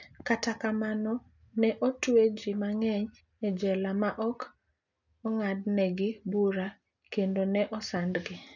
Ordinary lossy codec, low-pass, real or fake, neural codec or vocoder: none; 7.2 kHz; real; none